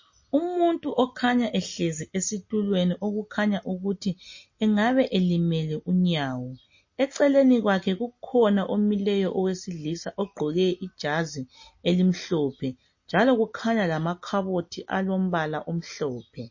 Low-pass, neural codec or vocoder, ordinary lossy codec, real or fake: 7.2 kHz; none; MP3, 32 kbps; real